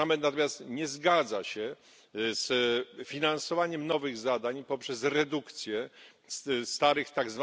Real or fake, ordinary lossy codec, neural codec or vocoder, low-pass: real; none; none; none